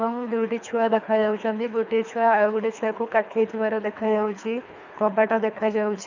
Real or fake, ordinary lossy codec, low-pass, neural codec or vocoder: fake; none; 7.2 kHz; codec, 24 kHz, 3 kbps, HILCodec